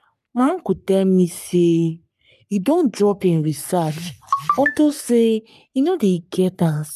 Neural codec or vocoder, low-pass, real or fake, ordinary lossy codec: codec, 44.1 kHz, 3.4 kbps, Pupu-Codec; 14.4 kHz; fake; none